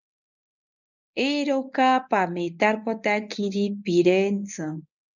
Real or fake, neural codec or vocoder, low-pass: fake; codec, 24 kHz, 0.9 kbps, WavTokenizer, medium speech release version 1; 7.2 kHz